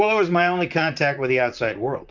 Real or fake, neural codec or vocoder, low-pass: fake; vocoder, 44.1 kHz, 128 mel bands, Pupu-Vocoder; 7.2 kHz